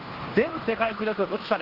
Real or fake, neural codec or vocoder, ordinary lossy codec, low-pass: fake; codec, 16 kHz in and 24 kHz out, 0.9 kbps, LongCat-Audio-Codec, fine tuned four codebook decoder; Opus, 16 kbps; 5.4 kHz